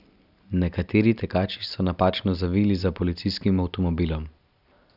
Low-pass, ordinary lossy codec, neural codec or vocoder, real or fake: 5.4 kHz; none; none; real